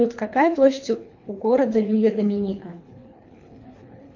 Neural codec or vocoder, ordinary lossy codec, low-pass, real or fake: codec, 24 kHz, 3 kbps, HILCodec; AAC, 48 kbps; 7.2 kHz; fake